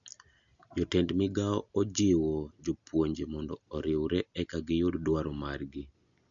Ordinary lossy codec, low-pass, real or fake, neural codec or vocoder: none; 7.2 kHz; real; none